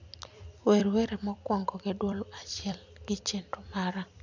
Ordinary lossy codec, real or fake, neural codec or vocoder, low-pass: none; fake; vocoder, 44.1 kHz, 128 mel bands every 256 samples, BigVGAN v2; 7.2 kHz